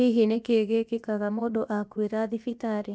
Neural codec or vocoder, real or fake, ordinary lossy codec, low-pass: codec, 16 kHz, about 1 kbps, DyCAST, with the encoder's durations; fake; none; none